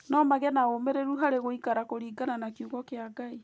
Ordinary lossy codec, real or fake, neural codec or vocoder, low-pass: none; real; none; none